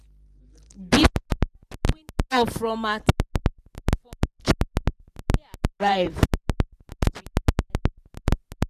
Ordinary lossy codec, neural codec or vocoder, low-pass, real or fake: none; vocoder, 44.1 kHz, 128 mel bands every 512 samples, BigVGAN v2; 14.4 kHz; fake